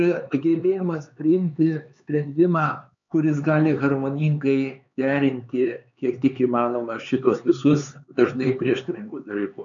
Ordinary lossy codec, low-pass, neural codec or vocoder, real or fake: MP3, 96 kbps; 7.2 kHz; codec, 16 kHz, 4 kbps, X-Codec, HuBERT features, trained on LibriSpeech; fake